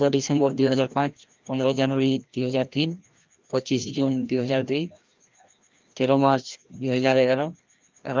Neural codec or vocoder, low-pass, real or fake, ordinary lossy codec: codec, 16 kHz, 1 kbps, FreqCodec, larger model; 7.2 kHz; fake; Opus, 24 kbps